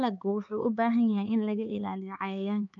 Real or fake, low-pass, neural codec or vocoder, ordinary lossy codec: fake; 7.2 kHz; codec, 16 kHz, 4 kbps, X-Codec, HuBERT features, trained on LibriSpeech; MP3, 64 kbps